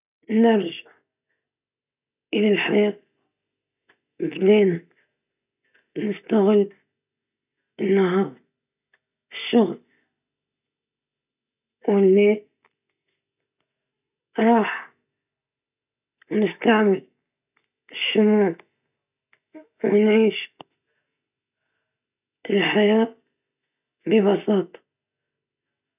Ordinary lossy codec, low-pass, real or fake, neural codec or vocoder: none; 3.6 kHz; real; none